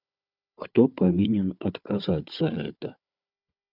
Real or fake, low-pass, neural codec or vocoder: fake; 5.4 kHz; codec, 16 kHz, 4 kbps, FunCodec, trained on Chinese and English, 50 frames a second